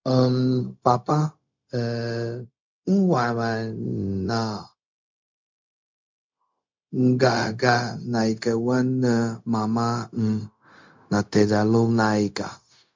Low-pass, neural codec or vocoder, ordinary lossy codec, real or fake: 7.2 kHz; codec, 16 kHz, 0.4 kbps, LongCat-Audio-Codec; MP3, 48 kbps; fake